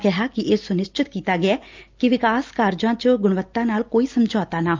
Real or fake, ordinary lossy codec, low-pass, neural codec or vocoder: real; Opus, 24 kbps; 7.2 kHz; none